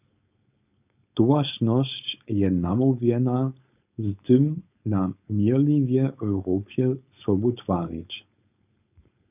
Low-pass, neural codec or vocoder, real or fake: 3.6 kHz; codec, 16 kHz, 4.8 kbps, FACodec; fake